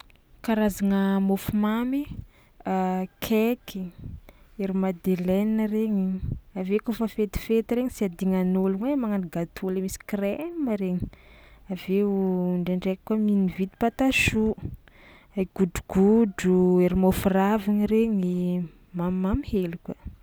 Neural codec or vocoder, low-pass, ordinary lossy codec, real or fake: none; none; none; real